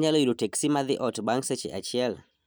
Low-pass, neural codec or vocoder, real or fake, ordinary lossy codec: none; none; real; none